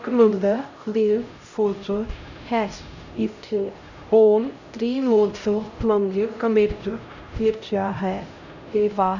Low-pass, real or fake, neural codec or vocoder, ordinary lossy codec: 7.2 kHz; fake; codec, 16 kHz, 0.5 kbps, X-Codec, HuBERT features, trained on LibriSpeech; none